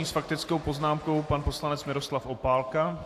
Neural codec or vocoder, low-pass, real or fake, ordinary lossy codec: none; 14.4 kHz; real; AAC, 64 kbps